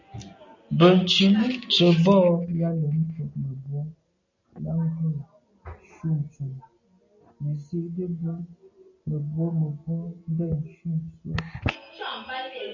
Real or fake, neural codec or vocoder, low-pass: real; none; 7.2 kHz